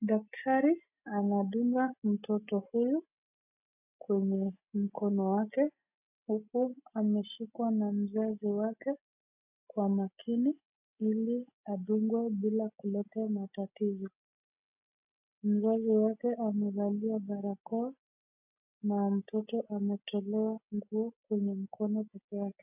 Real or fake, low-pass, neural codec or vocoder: real; 3.6 kHz; none